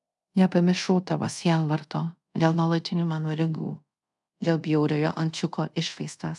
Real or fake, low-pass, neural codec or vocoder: fake; 10.8 kHz; codec, 24 kHz, 0.5 kbps, DualCodec